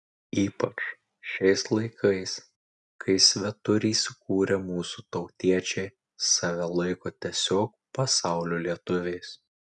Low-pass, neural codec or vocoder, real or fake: 10.8 kHz; none; real